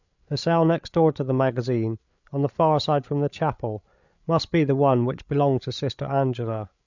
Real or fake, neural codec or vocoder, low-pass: fake; codec, 16 kHz, 8 kbps, FreqCodec, larger model; 7.2 kHz